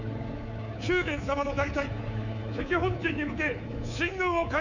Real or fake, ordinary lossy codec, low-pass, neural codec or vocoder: fake; none; 7.2 kHz; codec, 24 kHz, 3.1 kbps, DualCodec